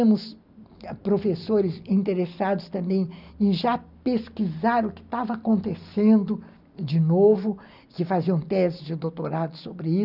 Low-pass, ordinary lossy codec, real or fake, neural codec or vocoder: 5.4 kHz; none; real; none